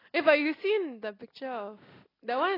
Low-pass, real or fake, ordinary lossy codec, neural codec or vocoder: 5.4 kHz; real; AAC, 24 kbps; none